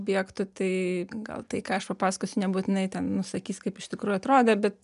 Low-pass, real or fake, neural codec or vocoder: 10.8 kHz; real; none